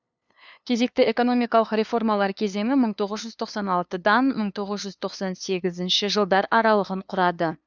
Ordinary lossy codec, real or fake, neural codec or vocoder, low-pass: none; fake; codec, 16 kHz, 2 kbps, FunCodec, trained on LibriTTS, 25 frames a second; 7.2 kHz